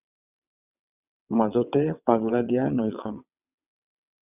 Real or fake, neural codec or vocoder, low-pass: fake; vocoder, 22.05 kHz, 80 mel bands, WaveNeXt; 3.6 kHz